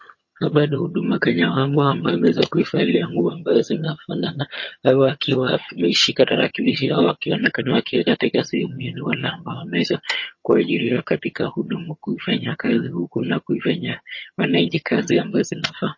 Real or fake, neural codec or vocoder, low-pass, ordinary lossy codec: fake; vocoder, 22.05 kHz, 80 mel bands, HiFi-GAN; 7.2 kHz; MP3, 32 kbps